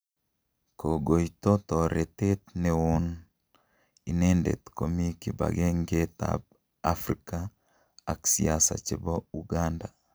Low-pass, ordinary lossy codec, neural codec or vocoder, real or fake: none; none; none; real